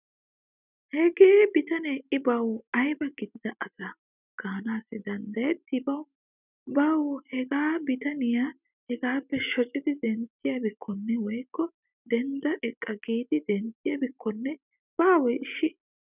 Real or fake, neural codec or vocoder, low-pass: real; none; 3.6 kHz